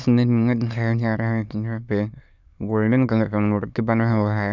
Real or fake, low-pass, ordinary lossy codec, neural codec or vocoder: fake; 7.2 kHz; none; autoencoder, 22.05 kHz, a latent of 192 numbers a frame, VITS, trained on many speakers